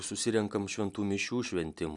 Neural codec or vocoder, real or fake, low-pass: none; real; 10.8 kHz